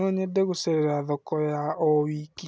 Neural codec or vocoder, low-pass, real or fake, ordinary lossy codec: none; none; real; none